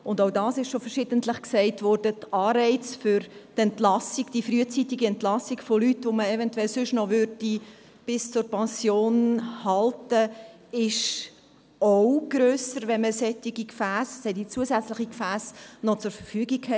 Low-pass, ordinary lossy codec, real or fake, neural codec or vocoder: none; none; real; none